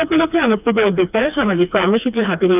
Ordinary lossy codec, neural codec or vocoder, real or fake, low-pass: none; codec, 16 kHz, 2 kbps, FreqCodec, smaller model; fake; 3.6 kHz